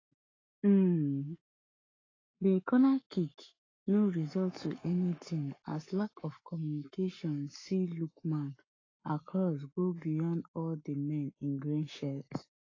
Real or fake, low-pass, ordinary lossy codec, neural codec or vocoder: fake; 7.2 kHz; AAC, 32 kbps; codec, 44.1 kHz, 7.8 kbps, Pupu-Codec